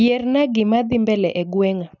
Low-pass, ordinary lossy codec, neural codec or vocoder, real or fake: 7.2 kHz; none; none; real